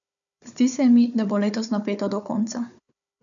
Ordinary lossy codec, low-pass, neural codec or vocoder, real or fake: none; 7.2 kHz; codec, 16 kHz, 4 kbps, FunCodec, trained on Chinese and English, 50 frames a second; fake